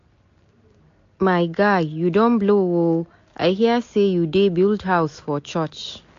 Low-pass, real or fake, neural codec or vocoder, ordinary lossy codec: 7.2 kHz; real; none; AAC, 48 kbps